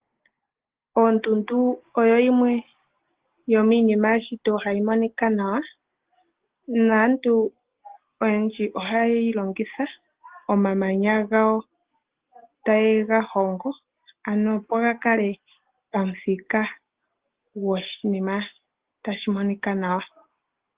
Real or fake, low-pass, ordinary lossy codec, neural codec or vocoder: real; 3.6 kHz; Opus, 16 kbps; none